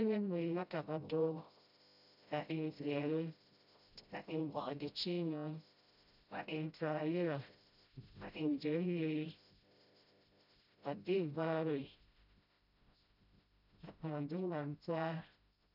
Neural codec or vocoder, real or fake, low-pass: codec, 16 kHz, 0.5 kbps, FreqCodec, smaller model; fake; 5.4 kHz